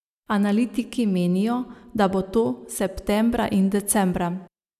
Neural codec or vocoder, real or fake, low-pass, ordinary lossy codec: none; real; 14.4 kHz; none